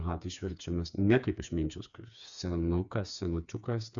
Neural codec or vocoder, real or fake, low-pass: codec, 16 kHz, 4 kbps, FreqCodec, smaller model; fake; 7.2 kHz